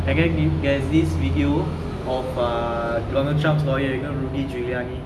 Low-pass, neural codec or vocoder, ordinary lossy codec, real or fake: none; none; none; real